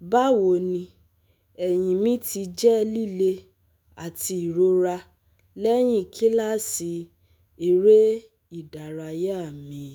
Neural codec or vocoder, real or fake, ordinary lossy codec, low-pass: autoencoder, 48 kHz, 128 numbers a frame, DAC-VAE, trained on Japanese speech; fake; none; none